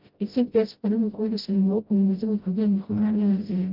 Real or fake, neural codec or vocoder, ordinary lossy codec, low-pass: fake; codec, 16 kHz, 0.5 kbps, FreqCodec, smaller model; Opus, 24 kbps; 5.4 kHz